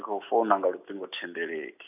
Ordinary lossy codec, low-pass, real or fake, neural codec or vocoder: none; 3.6 kHz; real; none